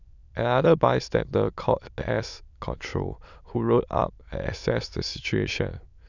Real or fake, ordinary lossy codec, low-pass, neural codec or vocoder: fake; none; 7.2 kHz; autoencoder, 22.05 kHz, a latent of 192 numbers a frame, VITS, trained on many speakers